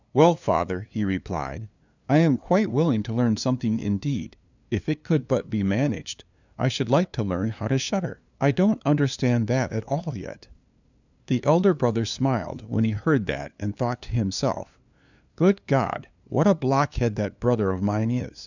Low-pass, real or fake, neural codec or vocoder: 7.2 kHz; fake; codec, 16 kHz, 2 kbps, FunCodec, trained on LibriTTS, 25 frames a second